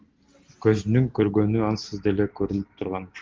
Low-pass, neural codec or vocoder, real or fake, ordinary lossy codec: 7.2 kHz; none; real; Opus, 16 kbps